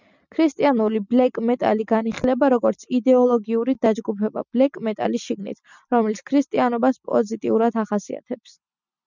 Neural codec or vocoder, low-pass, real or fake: none; 7.2 kHz; real